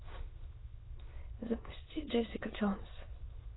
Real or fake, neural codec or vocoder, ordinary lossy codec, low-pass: fake; autoencoder, 22.05 kHz, a latent of 192 numbers a frame, VITS, trained on many speakers; AAC, 16 kbps; 7.2 kHz